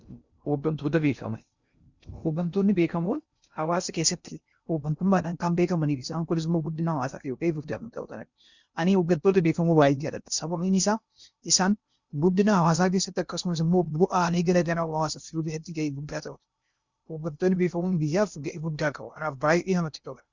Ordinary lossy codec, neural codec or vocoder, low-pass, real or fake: Opus, 64 kbps; codec, 16 kHz in and 24 kHz out, 0.6 kbps, FocalCodec, streaming, 2048 codes; 7.2 kHz; fake